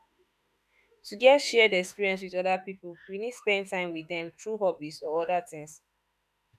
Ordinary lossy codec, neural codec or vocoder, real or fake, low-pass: none; autoencoder, 48 kHz, 32 numbers a frame, DAC-VAE, trained on Japanese speech; fake; 14.4 kHz